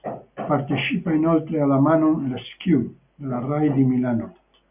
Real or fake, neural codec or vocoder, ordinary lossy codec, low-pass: real; none; AAC, 32 kbps; 3.6 kHz